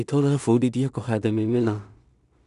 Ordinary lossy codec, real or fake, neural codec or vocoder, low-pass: none; fake; codec, 16 kHz in and 24 kHz out, 0.4 kbps, LongCat-Audio-Codec, two codebook decoder; 10.8 kHz